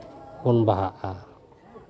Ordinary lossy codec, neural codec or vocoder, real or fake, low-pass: none; none; real; none